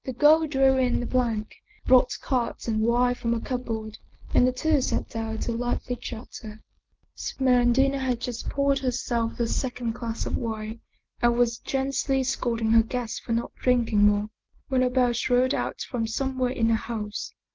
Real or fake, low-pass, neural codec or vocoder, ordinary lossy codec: real; 7.2 kHz; none; Opus, 16 kbps